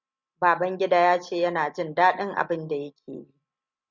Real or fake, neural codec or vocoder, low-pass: real; none; 7.2 kHz